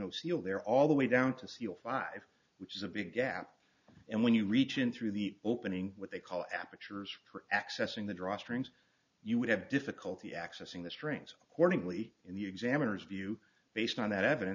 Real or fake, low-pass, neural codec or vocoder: real; 7.2 kHz; none